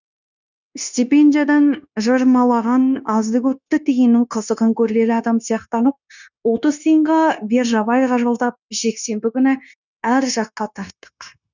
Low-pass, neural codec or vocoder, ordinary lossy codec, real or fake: 7.2 kHz; codec, 16 kHz, 0.9 kbps, LongCat-Audio-Codec; none; fake